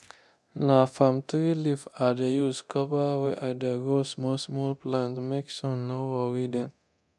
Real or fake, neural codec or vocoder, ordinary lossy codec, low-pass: fake; codec, 24 kHz, 0.9 kbps, DualCodec; none; none